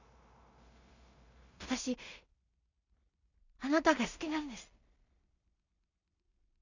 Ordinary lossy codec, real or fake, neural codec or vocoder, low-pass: none; fake; codec, 16 kHz in and 24 kHz out, 0.4 kbps, LongCat-Audio-Codec, two codebook decoder; 7.2 kHz